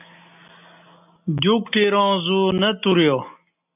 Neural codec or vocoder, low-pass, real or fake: none; 3.6 kHz; real